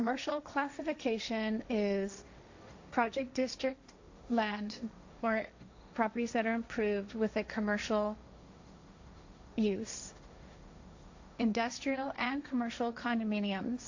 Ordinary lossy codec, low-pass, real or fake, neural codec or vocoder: AAC, 48 kbps; 7.2 kHz; fake; codec, 16 kHz, 1.1 kbps, Voila-Tokenizer